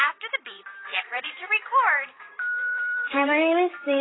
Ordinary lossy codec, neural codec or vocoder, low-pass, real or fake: AAC, 16 kbps; vocoder, 44.1 kHz, 128 mel bands, Pupu-Vocoder; 7.2 kHz; fake